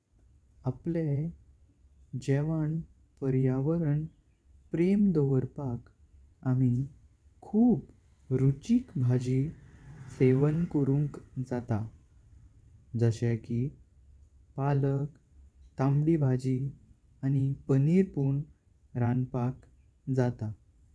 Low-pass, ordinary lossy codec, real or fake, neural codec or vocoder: none; none; fake; vocoder, 22.05 kHz, 80 mel bands, WaveNeXt